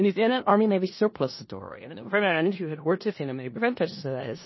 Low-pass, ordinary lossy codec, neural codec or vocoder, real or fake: 7.2 kHz; MP3, 24 kbps; codec, 16 kHz in and 24 kHz out, 0.4 kbps, LongCat-Audio-Codec, four codebook decoder; fake